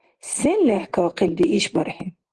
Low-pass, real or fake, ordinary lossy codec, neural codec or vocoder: 10.8 kHz; real; Opus, 16 kbps; none